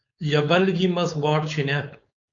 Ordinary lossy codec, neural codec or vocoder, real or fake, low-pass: MP3, 48 kbps; codec, 16 kHz, 4.8 kbps, FACodec; fake; 7.2 kHz